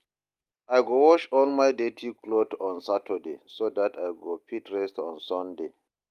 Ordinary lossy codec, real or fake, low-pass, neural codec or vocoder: Opus, 32 kbps; real; 14.4 kHz; none